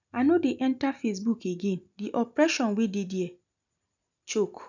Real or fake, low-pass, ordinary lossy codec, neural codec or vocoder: real; 7.2 kHz; none; none